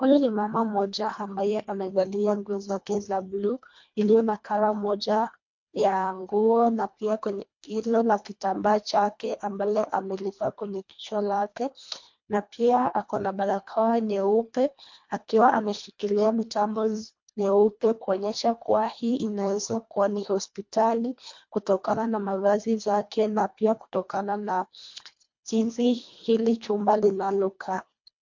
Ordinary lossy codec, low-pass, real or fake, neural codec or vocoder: MP3, 48 kbps; 7.2 kHz; fake; codec, 24 kHz, 1.5 kbps, HILCodec